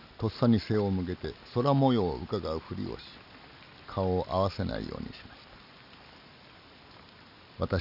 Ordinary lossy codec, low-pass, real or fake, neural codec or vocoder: none; 5.4 kHz; real; none